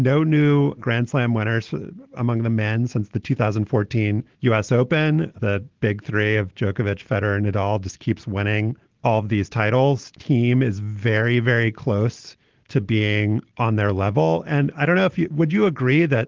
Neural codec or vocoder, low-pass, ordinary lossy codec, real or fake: none; 7.2 kHz; Opus, 16 kbps; real